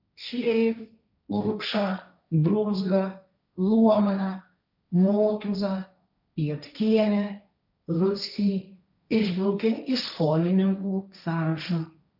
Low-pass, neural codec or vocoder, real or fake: 5.4 kHz; codec, 16 kHz, 1.1 kbps, Voila-Tokenizer; fake